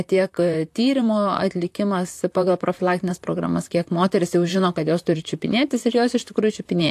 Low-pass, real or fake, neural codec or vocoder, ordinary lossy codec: 14.4 kHz; fake; vocoder, 44.1 kHz, 128 mel bands, Pupu-Vocoder; AAC, 64 kbps